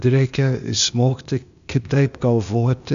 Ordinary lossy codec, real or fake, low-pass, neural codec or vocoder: AAC, 96 kbps; fake; 7.2 kHz; codec, 16 kHz, 0.8 kbps, ZipCodec